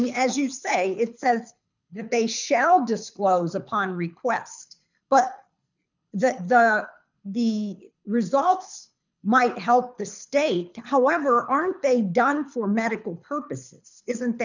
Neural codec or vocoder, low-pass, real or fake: codec, 24 kHz, 6 kbps, HILCodec; 7.2 kHz; fake